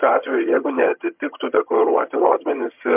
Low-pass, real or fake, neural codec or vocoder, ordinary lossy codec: 3.6 kHz; fake; vocoder, 22.05 kHz, 80 mel bands, HiFi-GAN; MP3, 32 kbps